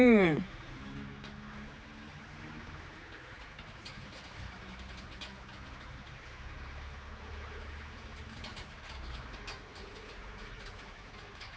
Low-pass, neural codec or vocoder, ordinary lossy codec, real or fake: none; codec, 16 kHz, 4 kbps, X-Codec, HuBERT features, trained on general audio; none; fake